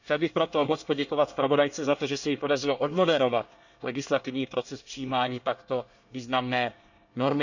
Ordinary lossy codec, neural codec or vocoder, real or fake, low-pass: none; codec, 24 kHz, 1 kbps, SNAC; fake; 7.2 kHz